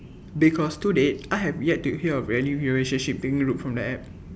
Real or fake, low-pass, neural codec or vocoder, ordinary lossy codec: real; none; none; none